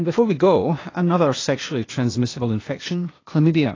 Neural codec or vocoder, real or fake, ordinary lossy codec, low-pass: codec, 16 kHz, 0.8 kbps, ZipCodec; fake; AAC, 32 kbps; 7.2 kHz